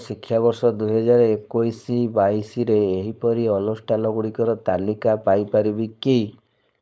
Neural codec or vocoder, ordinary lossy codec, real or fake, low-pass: codec, 16 kHz, 4.8 kbps, FACodec; none; fake; none